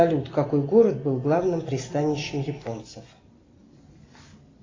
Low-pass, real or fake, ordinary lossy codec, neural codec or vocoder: 7.2 kHz; real; AAC, 32 kbps; none